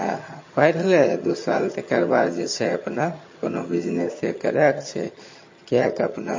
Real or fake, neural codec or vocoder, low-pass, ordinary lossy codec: fake; vocoder, 22.05 kHz, 80 mel bands, HiFi-GAN; 7.2 kHz; MP3, 32 kbps